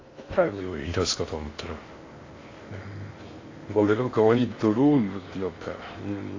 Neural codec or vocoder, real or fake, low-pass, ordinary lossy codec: codec, 16 kHz in and 24 kHz out, 0.6 kbps, FocalCodec, streaming, 2048 codes; fake; 7.2 kHz; AAC, 32 kbps